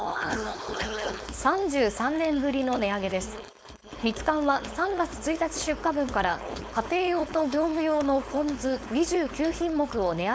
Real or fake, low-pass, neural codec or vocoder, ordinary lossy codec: fake; none; codec, 16 kHz, 4.8 kbps, FACodec; none